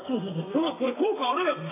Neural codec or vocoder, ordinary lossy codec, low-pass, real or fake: codec, 32 kHz, 1.9 kbps, SNAC; AAC, 24 kbps; 3.6 kHz; fake